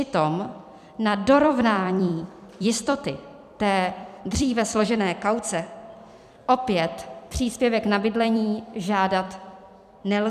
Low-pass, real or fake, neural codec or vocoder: 14.4 kHz; fake; vocoder, 44.1 kHz, 128 mel bands every 256 samples, BigVGAN v2